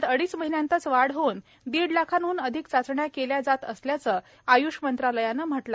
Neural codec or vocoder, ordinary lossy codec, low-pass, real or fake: none; none; none; real